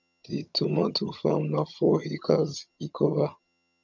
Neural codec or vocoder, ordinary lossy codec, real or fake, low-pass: vocoder, 22.05 kHz, 80 mel bands, HiFi-GAN; none; fake; 7.2 kHz